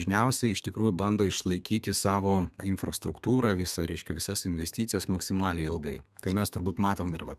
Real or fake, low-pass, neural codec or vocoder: fake; 14.4 kHz; codec, 32 kHz, 1.9 kbps, SNAC